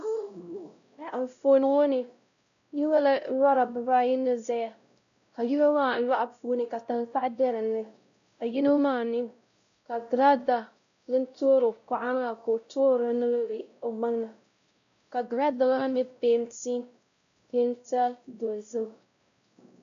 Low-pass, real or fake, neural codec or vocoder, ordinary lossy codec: 7.2 kHz; fake; codec, 16 kHz, 0.5 kbps, X-Codec, WavLM features, trained on Multilingual LibriSpeech; MP3, 64 kbps